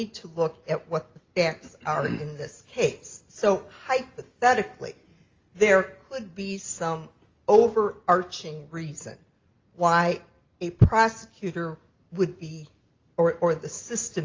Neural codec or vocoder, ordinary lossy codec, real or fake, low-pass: none; Opus, 32 kbps; real; 7.2 kHz